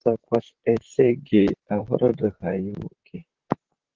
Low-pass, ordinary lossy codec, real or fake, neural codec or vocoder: 7.2 kHz; Opus, 16 kbps; fake; vocoder, 44.1 kHz, 128 mel bands, Pupu-Vocoder